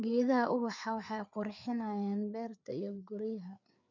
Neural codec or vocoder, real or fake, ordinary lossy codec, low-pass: codec, 16 kHz, 4 kbps, FreqCodec, larger model; fake; none; 7.2 kHz